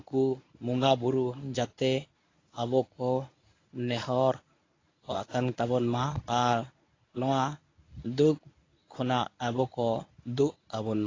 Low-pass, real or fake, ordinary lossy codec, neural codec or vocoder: 7.2 kHz; fake; AAC, 32 kbps; codec, 24 kHz, 0.9 kbps, WavTokenizer, medium speech release version 1